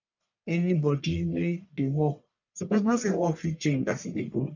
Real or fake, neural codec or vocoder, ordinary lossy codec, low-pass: fake; codec, 44.1 kHz, 1.7 kbps, Pupu-Codec; none; 7.2 kHz